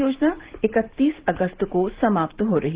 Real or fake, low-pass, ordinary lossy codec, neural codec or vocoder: real; 3.6 kHz; Opus, 16 kbps; none